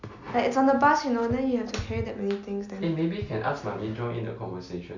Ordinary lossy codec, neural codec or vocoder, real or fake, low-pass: none; none; real; 7.2 kHz